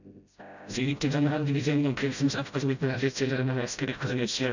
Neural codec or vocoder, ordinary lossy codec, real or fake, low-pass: codec, 16 kHz, 0.5 kbps, FreqCodec, smaller model; none; fake; 7.2 kHz